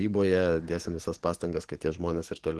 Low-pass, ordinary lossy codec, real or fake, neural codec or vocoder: 10.8 kHz; Opus, 16 kbps; fake; codec, 44.1 kHz, 7.8 kbps, DAC